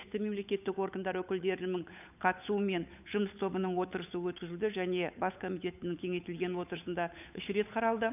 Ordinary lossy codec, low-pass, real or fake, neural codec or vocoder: none; 3.6 kHz; fake; codec, 16 kHz, 8 kbps, FunCodec, trained on Chinese and English, 25 frames a second